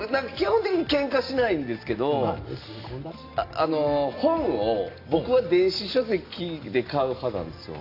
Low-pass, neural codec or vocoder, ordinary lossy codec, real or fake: 5.4 kHz; none; AAC, 32 kbps; real